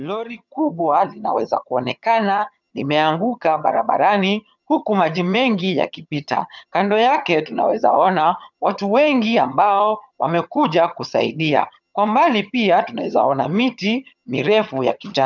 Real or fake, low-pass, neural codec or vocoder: fake; 7.2 kHz; vocoder, 22.05 kHz, 80 mel bands, HiFi-GAN